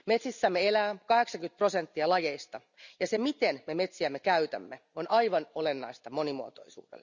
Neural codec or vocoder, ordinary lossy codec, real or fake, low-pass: none; none; real; 7.2 kHz